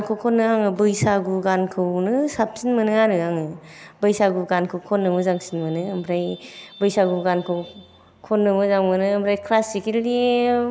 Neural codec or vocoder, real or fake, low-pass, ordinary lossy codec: none; real; none; none